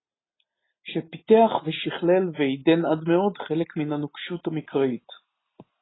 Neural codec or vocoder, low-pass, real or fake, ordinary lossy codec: none; 7.2 kHz; real; AAC, 16 kbps